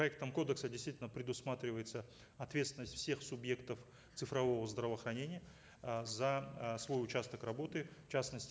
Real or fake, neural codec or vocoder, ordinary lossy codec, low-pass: real; none; none; none